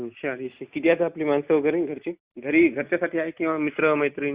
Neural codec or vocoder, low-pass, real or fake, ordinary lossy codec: none; 3.6 kHz; real; Opus, 64 kbps